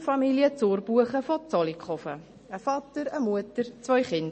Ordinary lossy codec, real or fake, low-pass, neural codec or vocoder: MP3, 32 kbps; real; 10.8 kHz; none